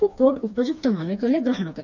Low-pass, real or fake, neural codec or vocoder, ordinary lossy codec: 7.2 kHz; fake; codec, 16 kHz, 2 kbps, FreqCodec, smaller model; none